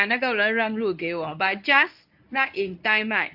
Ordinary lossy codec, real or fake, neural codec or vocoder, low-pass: AAC, 48 kbps; fake; codec, 24 kHz, 0.9 kbps, WavTokenizer, medium speech release version 1; 5.4 kHz